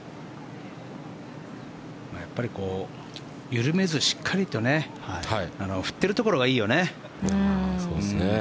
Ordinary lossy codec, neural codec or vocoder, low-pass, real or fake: none; none; none; real